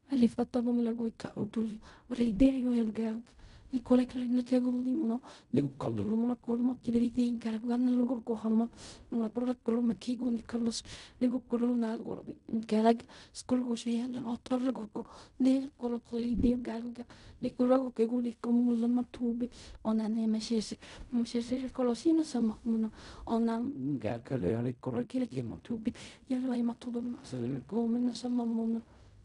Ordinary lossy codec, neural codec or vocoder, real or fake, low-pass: none; codec, 16 kHz in and 24 kHz out, 0.4 kbps, LongCat-Audio-Codec, fine tuned four codebook decoder; fake; 10.8 kHz